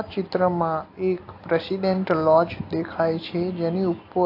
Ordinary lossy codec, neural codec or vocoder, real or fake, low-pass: AAC, 32 kbps; none; real; 5.4 kHz